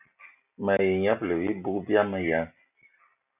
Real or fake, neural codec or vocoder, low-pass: real; none; 3.6 kHz